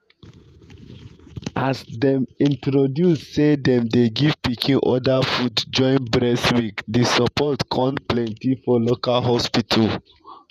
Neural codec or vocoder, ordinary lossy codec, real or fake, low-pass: vocoder, 48 kHz, 128 mel bands, Vocos; none; fake; 14.4 kHz